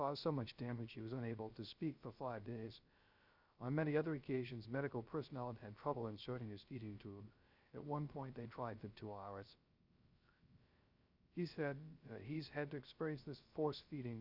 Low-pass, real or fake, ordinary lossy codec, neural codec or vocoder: 5.4 kHz; fake; Opus, 64 kbps; codec, 16 kHz, 0.3 kbps, FocalCodec